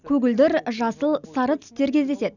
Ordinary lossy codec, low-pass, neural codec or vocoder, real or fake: none; 7.2 kHz; none; real